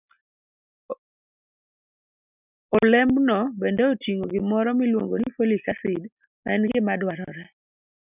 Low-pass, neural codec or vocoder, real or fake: 3.6 kHz; none; real